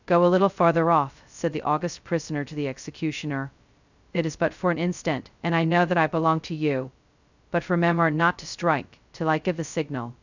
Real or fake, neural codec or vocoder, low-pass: fake; codec, 16 kHz, 0.2 kbps, FocalCodec; 7.2 kHz